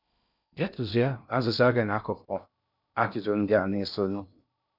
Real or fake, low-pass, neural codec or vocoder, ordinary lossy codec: fake; 5.4 kHz; codec, 16 kHz in and 24 kHz out, 0.6 kbps, FocalCodec, streaming, 2048 codes; none